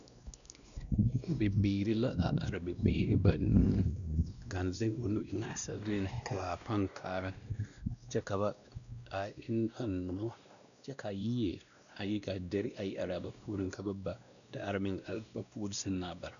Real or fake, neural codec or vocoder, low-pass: fake; codec, 16 kHz, 1 kbps, X-Codec, WavLM features, trained on Multilingual LibriSpeech; 7.2 kHz